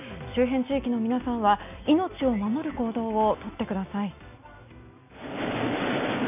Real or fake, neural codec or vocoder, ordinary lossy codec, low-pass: real; none; none; 3.6 kHz